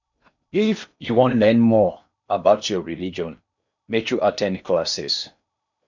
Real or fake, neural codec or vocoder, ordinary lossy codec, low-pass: fake; codec, 16 kHz in and 24 kHz out, 0.8 kbps, FocalCodec, streaming, 65536 codes; none; 7.2 kHz